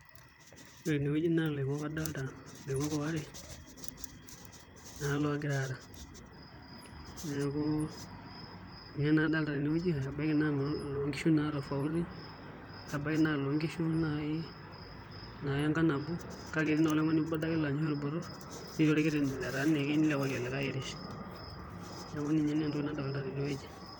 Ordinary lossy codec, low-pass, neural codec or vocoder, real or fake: none; none; vocoder, 44.1 kHz, 128 mel bands, Pupu-Vocoder; fake